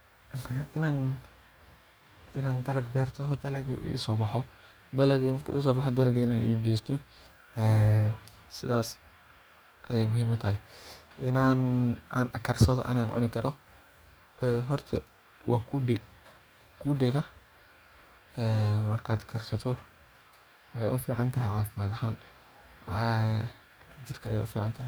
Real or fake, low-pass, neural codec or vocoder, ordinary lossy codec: fake; none; codec, 44.1 kHz, 2.6 kbps, DAC; none